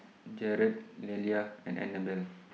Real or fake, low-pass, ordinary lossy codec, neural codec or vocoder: real; none; none; none